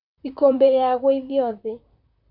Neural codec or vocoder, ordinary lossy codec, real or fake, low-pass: vocoder, 44.1 kHz, 128 mel bands, Pupu-Vocoder; none; fake; 5.4 kHz